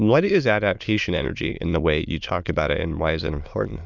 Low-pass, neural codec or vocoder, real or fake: 7.2 kHz; autoencoder, 22.05 kHz, a latent of 192 numbers a frame, VITS, trained on many speakers; fake